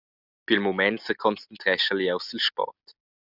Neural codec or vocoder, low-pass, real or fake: none; 5.4 kHz; real